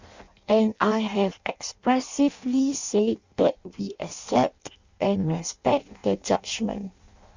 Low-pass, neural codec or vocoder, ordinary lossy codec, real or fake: 7.2 kHz; codec, 16 kHz in and 24 kHz out, 0.6 kbps, FireRedTTS-2 codec; Opus, 64 kbps; fake